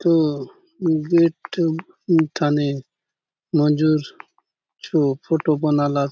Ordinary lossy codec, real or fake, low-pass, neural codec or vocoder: none; real; none; none